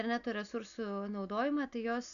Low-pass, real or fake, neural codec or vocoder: 7.2 kHz; real; none